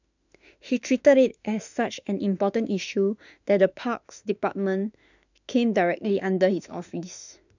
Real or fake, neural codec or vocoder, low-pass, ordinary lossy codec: fake; autoencoder, 48 kHz, 32 numbers a frame, DAC-VAE, trained on Japanese speech; 7.2 kHz; none